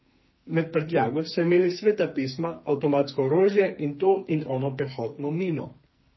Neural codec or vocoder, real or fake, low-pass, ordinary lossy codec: codec, 44.1 kHz, 2.6 kbps, SNAC; fake; 7.2 kHz; MP3, 24 kbps